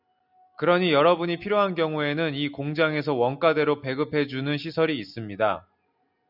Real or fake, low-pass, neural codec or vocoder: real; 5.4 kHz; none